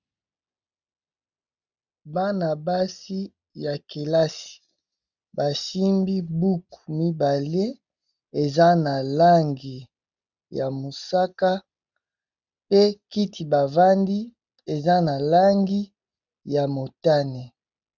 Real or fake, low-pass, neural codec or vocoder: real; 7.2 kHz; none